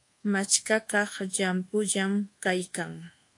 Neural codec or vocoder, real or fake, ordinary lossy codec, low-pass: codec, 24 kHz, 1.2 kbps, DualCodec; fake; AAC, 48 kbps; 10.8 kHz